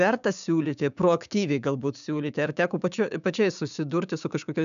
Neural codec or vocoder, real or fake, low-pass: codec, 16 kHz, 6 kbps, DAC; fake; 7.2 kHz